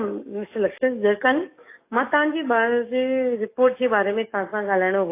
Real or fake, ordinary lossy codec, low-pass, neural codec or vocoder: fake; AAC, 24 kbps; 3.6 kHz; codec, 44.1 kHz, 7.8 kbps, DAC